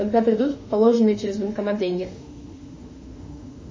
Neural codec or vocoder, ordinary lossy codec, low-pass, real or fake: autoencoder, 48 kHz, 32 numbers a frame, DAC-VAE, trained on Japanese speech; MP3, 32 kbps; 7.2 kHz; fake